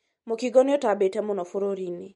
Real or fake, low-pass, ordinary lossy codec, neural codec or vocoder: real; 19.8 kHz; MP3, 48 kbps; none